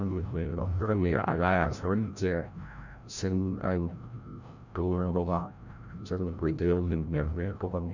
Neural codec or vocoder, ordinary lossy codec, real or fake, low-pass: codec, 16 kHz, 0.5 kbps, FreqCodec, larger model; none; fake; 7.2 kHz